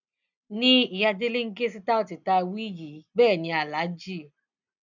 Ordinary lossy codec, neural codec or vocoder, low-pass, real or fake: none; none; 7.2 kHz; real